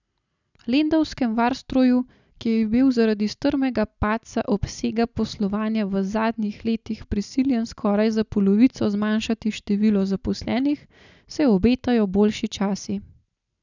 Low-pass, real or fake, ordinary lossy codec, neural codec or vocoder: 7.2 kHz; real; none; none